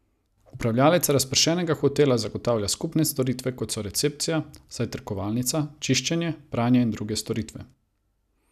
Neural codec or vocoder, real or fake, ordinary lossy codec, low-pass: none; real; none; 14.4 kHz